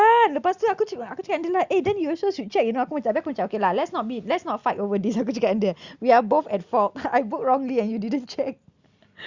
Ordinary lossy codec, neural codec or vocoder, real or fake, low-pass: Opus, 64 kbps; none; real; 7.2 kHz